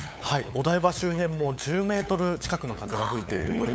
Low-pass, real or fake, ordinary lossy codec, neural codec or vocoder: none; fake; none; codec, 16 kHz, 8 kbps, FunCodec, trained on LibriTTS, 25 frames a second